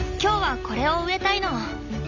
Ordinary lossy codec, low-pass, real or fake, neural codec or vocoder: AAC, 48 kbps; 7.2 kHz; real; none